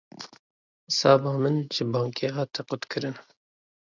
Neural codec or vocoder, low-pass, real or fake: none; 7.2 kHz; real